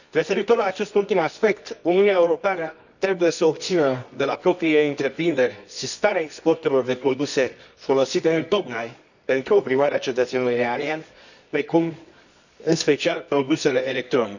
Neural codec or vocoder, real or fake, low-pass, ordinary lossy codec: codec, 24 kHz, 0.9 kbps, WavTokenizer, medium music audio release; fake; 7.2 kHz; none